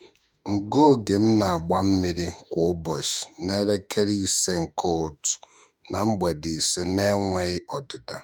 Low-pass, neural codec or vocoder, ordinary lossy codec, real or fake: 19.8 kHz; autoencoder, 48 kHz, 32 numbers a frame, DAC-VAE, trained on Japanese speech; none; fake